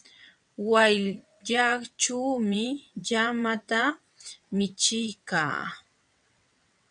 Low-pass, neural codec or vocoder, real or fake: 9.9 kHz; vocoder, 22.05 kHz, 80 mel bands, WaveNeXt; fake